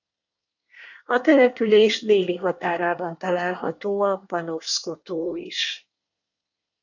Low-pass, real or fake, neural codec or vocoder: 7.2 kHz; fake; codec, 24 kHz, 1 kbps, SNAC